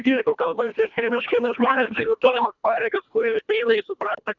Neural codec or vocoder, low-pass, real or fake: codec, 24 kHz, 1.5 kbps, HILCodec; 7.2 kHz; fake